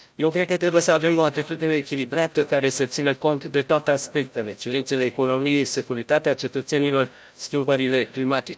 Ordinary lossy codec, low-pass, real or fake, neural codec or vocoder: none; none; fake; codec, 16 kHz, 0.5 kbps, FreqCodec, larger model